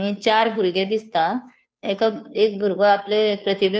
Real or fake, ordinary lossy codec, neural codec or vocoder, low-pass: fake; none; codec, 16 kHz, 2 kbps, FunCodec, trained on Chinese and English, 25 frames a second; none